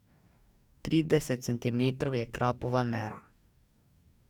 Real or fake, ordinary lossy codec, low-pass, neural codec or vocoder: fake; none; 19.8 kHz; codec, 44.1 kHz, 2.6 kbps, DAC